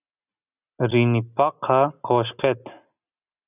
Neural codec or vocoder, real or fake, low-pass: none; real; 3.6 kHz